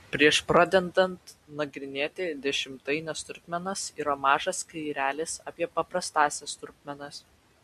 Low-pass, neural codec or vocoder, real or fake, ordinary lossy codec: 14.4 kHz; none; real; MP3, 64 kbps